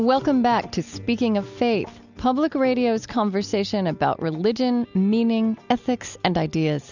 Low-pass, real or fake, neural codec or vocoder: 7.2 kHz; real; none